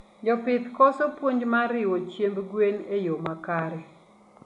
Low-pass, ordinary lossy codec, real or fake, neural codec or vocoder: 10.8 kHz; none; real; none